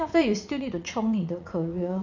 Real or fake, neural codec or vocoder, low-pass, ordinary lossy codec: fake; vocoder, 44.1 kHz, 80 mel bands, Vocos; 7.2 kHz; none